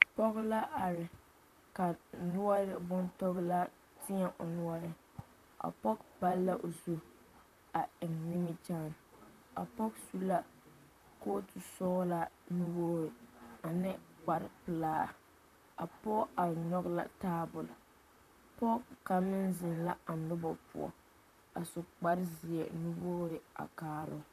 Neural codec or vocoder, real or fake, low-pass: vocoder, 44.1 kHz, 128 mel bands, Pupu-Vocoder; fake; 14.4 kHz